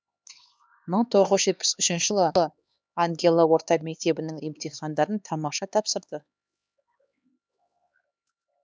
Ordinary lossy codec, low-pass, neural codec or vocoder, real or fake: none; none; codec, 16 kHz, 2 kbps, X-Codec, HuBERT features, trained on LibriSpeech; fake